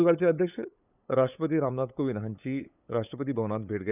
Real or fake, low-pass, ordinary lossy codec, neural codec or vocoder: fake; 3.6 kHz; none; codec, 16 kHz, 8 kbps, FunCodec, trained on LibriTTS, 25 frames a second